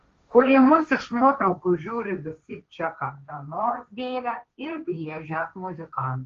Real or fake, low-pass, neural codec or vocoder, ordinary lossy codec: fake; 7.2 kHz; codec, 16 kHz, 1.1 kbps, Voila-Tokenizer; Opus, 32 kbps